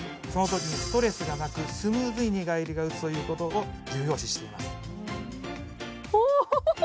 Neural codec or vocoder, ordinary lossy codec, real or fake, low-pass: none; none; real; none